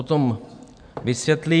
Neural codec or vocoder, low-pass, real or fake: none; 9.9 kHz; real